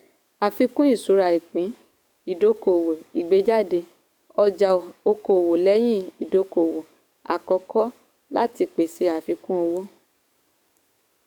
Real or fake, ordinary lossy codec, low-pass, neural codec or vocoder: fake; none; 19.8 kHz; codec, 44.1 kHz, 7.8 kbps, DAC